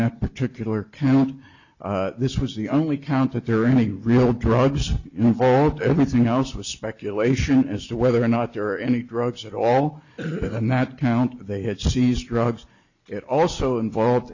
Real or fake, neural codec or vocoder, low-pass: real; none; 7.2 kHz